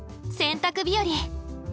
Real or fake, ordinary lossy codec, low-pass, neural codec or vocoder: real; none; none; none